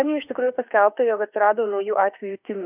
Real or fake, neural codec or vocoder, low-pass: fake; autoencoder, 48 kHz, 32 numbers a frame, DAC-VAE, trained on Japanese speech; 3.6 kHz